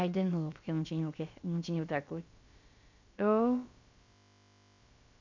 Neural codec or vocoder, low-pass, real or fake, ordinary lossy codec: codec, 16 kHz, about 1 kbps, DyCAST, with the encoder's durations; 7.2 kHz; fake; MP3, 64 kbps